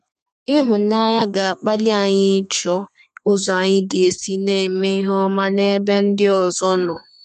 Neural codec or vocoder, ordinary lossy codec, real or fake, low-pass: codec, 32 kHz, 1.9 kbps, SNAC; MP3, 64 kbps; fake; 14.4 kHz